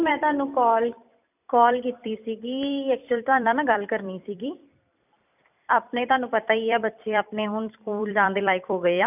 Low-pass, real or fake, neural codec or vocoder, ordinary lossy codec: 3.6 kHz; fake; vocoder, 44.1 kHz, 128 mel bands every 256 samples, BigVGAN v2; none